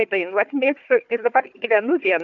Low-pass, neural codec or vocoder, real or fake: 7.2 kHz; codec, 16 kHz, 4 kbps, FunCodec, trained on Chinese and English, 50 frames a second; fake